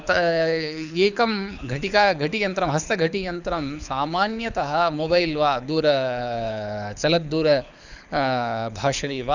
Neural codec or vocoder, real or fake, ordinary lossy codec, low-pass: codec, 24 kHz, 6 kbps, HILCodec; fake; none; 7.2 kHz